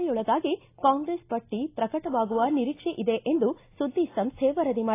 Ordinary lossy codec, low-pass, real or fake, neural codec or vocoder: AAC, 24 kbps; 3.6 kHz; real; none